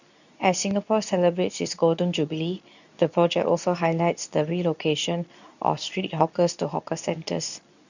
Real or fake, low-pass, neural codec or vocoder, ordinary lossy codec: fake; 7.2 kHz; codec, 24 kHz, 0.9 kbps, WavTokenizer, medium speech release version 2; none